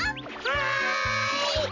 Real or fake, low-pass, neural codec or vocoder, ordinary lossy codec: real; 7.2 kHz; none; MP3, 64 kbps